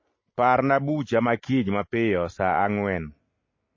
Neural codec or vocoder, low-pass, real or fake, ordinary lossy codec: none; 7.2 kHz; real; MP3, 32 kbps